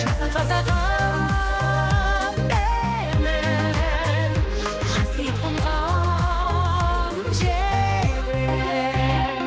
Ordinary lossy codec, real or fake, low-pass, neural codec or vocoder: none; fake; none; codec, 16 kHz, 2 kbps, X-Codec, HuBERT features, trained on general audio